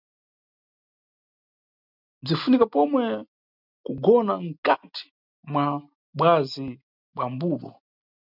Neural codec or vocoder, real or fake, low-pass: none; real; 5.4 kHz